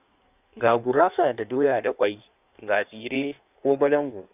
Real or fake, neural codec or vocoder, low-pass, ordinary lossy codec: fake; codec, 16 kHz in and 24 kHz out, 1.1 kbps, FireRedTTS-2 codec; 3.6 kHz; none